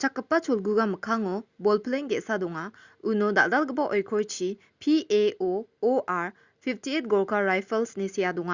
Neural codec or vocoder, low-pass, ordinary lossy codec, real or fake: none; 7.2 kHz; Opus, 64 kbps; real